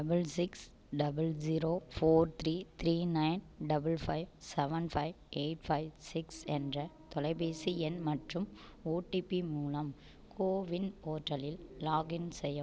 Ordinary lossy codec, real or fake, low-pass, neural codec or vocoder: none; real; none; none